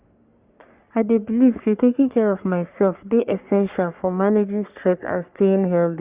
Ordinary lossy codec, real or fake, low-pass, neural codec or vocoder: Opus, 64 kbps; fake; 3.6 kHz; codec, 44.1 kHz, 3.4 kbps, Pupu-Codec